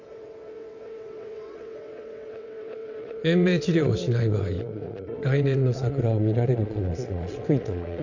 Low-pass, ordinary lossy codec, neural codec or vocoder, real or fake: 7.2 kHz; none; vocoder, 22.05 kHz, 80 mel bands, WaveNeXt; fake